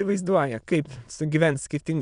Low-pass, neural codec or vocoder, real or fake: 9.9 kHz; autoencoder, 22.05 kHz, a latent of 192 numbers a frame, VITS, trained on many speakers; fake